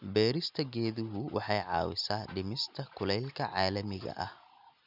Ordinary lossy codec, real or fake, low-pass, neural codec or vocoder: none; real; 5.4 kHz; none